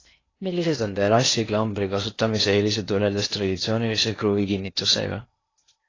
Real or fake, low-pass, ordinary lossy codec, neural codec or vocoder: fake; 7.2 kHz; AAC, 32 kbps; codec, 16 kHz in and 24 kHz out, 0.8 kbps, FocalCodec, streaming, 65536 codes